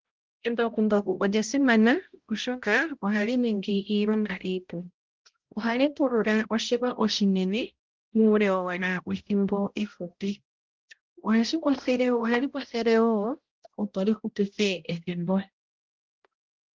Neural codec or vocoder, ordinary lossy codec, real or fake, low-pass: codec, 16 kHz, 0.5 kbps, X-Codec, HuBERT features, trained on balanced general audio; Opus, 16 kbps; fake; 7.2 kHz